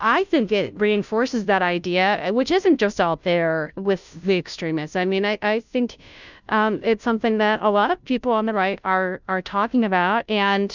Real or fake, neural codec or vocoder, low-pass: fake; codec, 16 kHz, 0.5 kbps, FunCodec, trained on Chinese and English, 25 frames a second; 7.2 kHz